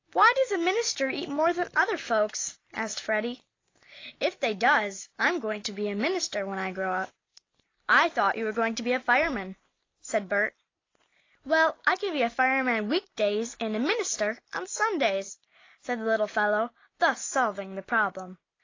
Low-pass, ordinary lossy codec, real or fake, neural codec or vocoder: 7.2 kHz; AAC, 32 kbps; real; none